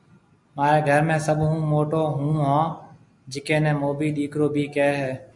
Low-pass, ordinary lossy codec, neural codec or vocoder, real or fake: 10.8 kHz; MP3, 96 kbps; none; real